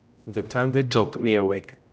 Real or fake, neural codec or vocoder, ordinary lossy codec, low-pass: fake; codec, 16 kHz, 0.5 kbps, X-Codec, HuBERT features, trained on general audio; none; none